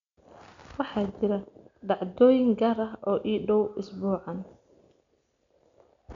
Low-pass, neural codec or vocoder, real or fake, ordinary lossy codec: 7.2 kHz; none; real; MP3, 64 kbps